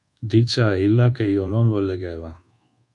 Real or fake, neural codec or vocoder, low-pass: fake; codec, 24 kHz, 1.2 kbps, DualCodec; 10.8 kHz